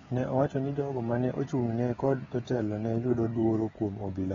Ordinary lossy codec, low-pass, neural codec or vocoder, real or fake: AAC, 24 kbps; 7.2 kHz; codec, 16 kHz, 16 kbps, FreqCodec, smaller model; fake